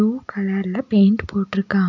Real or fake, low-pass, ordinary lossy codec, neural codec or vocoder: real; 7.2 kHz; none; none